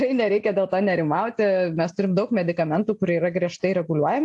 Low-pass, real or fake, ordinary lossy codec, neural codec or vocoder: 10.8 kHz; real; AAC, 64 kbps; none